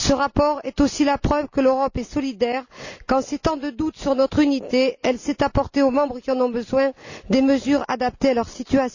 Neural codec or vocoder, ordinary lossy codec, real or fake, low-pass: none; none; real; 7.2 kHz